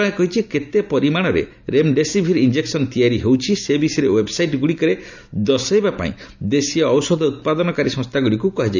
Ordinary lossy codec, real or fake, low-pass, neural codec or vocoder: none; real; 7.2 kHz; none